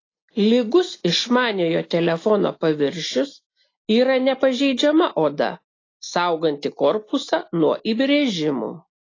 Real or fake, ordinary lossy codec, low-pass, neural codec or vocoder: real; AAC, 32 kbps; 7.2 kHz; none